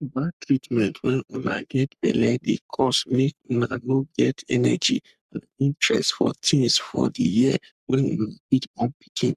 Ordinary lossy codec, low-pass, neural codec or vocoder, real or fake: none; 14.4 kHz; codec, 44.1 kHz, 3.4 kbps, Pupu-Codec; fake